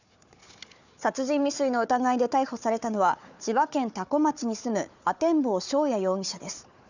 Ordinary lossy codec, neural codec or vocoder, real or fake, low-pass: none; codec, 16 kHz, 4 kbps, FunCodec, trained on Chinese and English, 50 frames a second; fake; 7.2 kHz